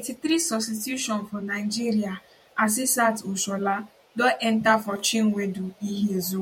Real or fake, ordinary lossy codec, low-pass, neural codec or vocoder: fake; MP3, 64 kbps; 19.8 kHz; vocoder, 48 kHz, 128 mel bands, Vocos